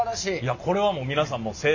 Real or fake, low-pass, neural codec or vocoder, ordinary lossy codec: real; 7.2 kHz; none; none